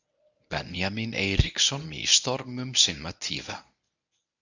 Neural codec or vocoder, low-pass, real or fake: codec, 24 kHz, 0.9 kbps, WavTokenizer, medium speech release version 1; 7.2 kHz; fake